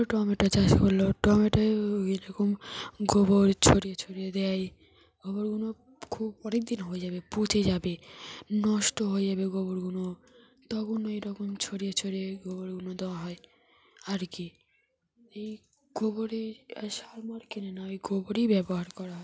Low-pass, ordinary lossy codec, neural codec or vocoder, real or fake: none; none; none; real